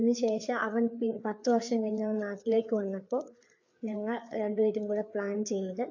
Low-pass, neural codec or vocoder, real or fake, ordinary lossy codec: 7.2 kHz; codec, 16 kHz, 4 kbps, FreqCodec, larger model; fake; AAC, 48 kbps